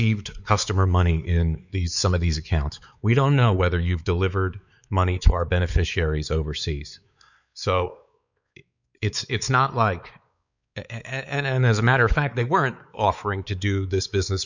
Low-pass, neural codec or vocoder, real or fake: 7.2 kHz; codec, 16 kHz, 4 kbps, X-Codec, WavLM features, trained on Multilingual LibriSpeech; fake